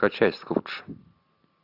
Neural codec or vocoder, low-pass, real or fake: none; 5.4 kHz; real